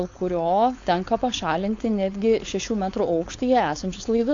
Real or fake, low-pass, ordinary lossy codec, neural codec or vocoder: fake; 7.2 kHz; AAC, 48 kbps; codec, 16 kHz, 4.8 kbps, FACodec